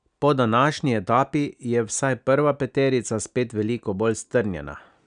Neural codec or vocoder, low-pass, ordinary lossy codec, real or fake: none; 9.9 kHz; none; real